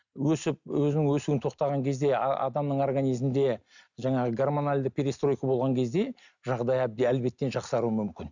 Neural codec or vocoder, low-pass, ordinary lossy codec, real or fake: none; 7.2 kHz; none; real